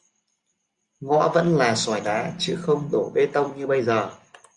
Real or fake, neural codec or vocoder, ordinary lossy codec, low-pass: real; none; Opus, 64 kbps; 10.8 kHz